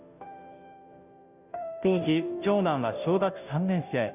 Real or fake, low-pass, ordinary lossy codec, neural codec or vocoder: fake; 3.6 kHz; none; codec, 16 kHz, 0.5 kbps, FunCodec, trained on Chinese and English, 25 frames a second